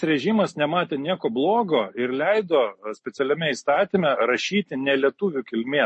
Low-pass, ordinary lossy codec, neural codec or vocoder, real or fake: 10.8 kHz; MP3, 32 kbps; none; real